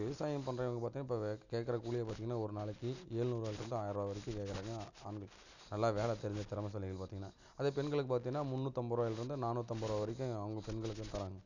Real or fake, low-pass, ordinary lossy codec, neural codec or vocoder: real; 7.2 kHz; none; none